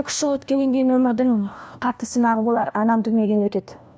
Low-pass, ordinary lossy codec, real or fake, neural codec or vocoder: none; none; fake; codec, 16 kHz, 1 kbps, FunCodec, trained on LibriTTS, 50 frames a second